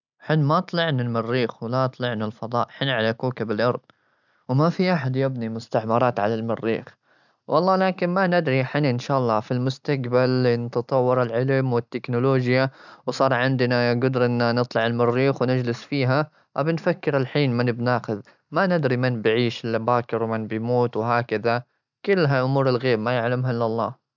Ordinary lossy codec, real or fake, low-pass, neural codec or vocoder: none; real; 7.2 kHz; none